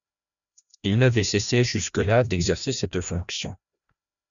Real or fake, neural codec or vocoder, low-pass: fake; codec, 16 kHz, 1 kbps, FreqCodec, larger model; 7.2 kHz